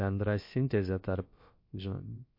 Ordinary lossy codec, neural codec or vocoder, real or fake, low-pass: MP3, 32 kbps; codec, 16 kHz, about 1 kbps, DyCAST, with the encoder's durations; fake; 5.4 kHz